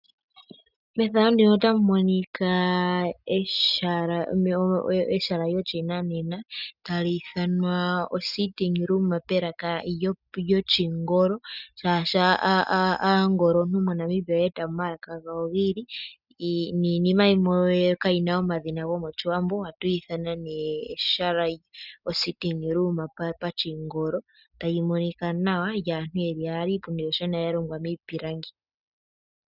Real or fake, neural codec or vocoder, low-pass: real; none; 5.4 kHz